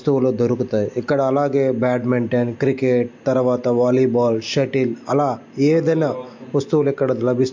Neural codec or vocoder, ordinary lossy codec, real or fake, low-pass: none; MP3, 48 kbps; real; 7.2 kHz